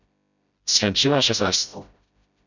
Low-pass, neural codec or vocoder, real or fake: 7.2 kHz; codec, 16 kHz, 0.5 kbps, FreqCodec, smaller model; fake